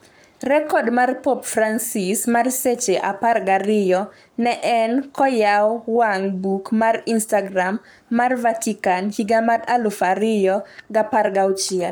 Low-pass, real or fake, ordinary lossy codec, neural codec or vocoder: none; fake; none; codec, 44.1 kHz, 7.8 kbps, Pupu-Codec